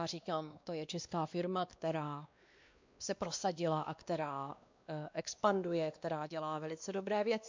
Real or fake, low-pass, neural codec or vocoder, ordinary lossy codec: fake; 7.2 kHz; codec, 16 kHz, 2 kbps, X-Codec, WavLM features, trained on Multilingual LibriSpeech; MP3, 64 kbps